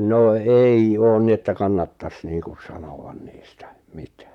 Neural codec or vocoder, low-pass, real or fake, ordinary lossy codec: vocoder, 44.1 kHz, 128 mel bands, Pupu-Vocoder; 19.8 kHz; fake; none